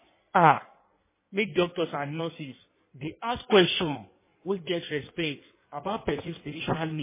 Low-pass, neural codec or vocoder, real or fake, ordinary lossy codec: 3.6 kHz; codec, 16 kHz in and 24 kHz out, 1.1 kbps, FireRedTTS-2 codec; fake; MP3, 16 kbps